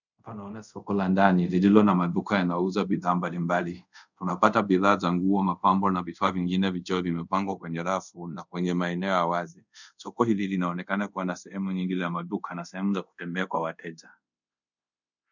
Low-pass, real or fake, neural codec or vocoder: 7.2 kHz; fake; codec, 24 kHz, 0.5 kbps, DualCodec